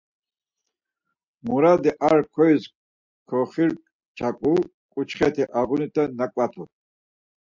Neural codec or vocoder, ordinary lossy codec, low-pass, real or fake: none; MP3, 64 kbps; 7.2 kHz; real